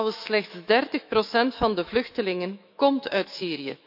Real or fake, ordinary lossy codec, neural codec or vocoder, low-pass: fake; none; autoencoder, 48 kHz, 128 numbers a frame, DAC-VAE, trained on Japanese speech; 5.4 kHz